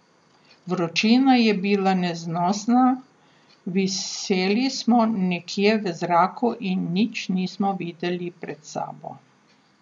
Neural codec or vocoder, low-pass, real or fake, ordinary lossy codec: none; 9.9 kHz; real; none